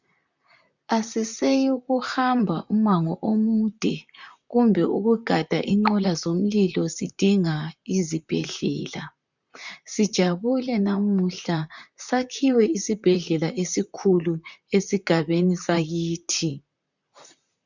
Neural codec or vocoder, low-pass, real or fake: vocoder, 44.1 kHz, 128 mel bands, Pupu-Vocoder; 7.2 kHz; fake